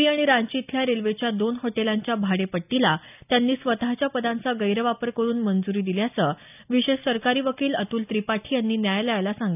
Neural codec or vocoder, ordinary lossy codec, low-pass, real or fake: none; none; 3.6 kHz; real